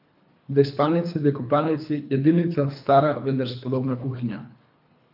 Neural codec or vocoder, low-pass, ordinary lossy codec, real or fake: codec, 24 kHz, 3 kbps, HILCodec; 5.4 kHz; AAC, 48 kbps; fake